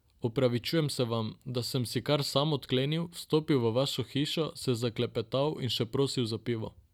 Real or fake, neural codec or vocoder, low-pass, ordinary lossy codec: real; none; 19.8 kHz; none